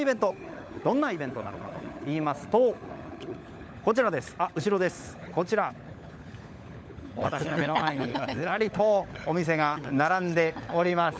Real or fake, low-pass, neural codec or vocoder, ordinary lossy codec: fake; none; codec, 16 kHz, 16 kbps, FunCodec, trained on LibriTTS, 50 frames a second; none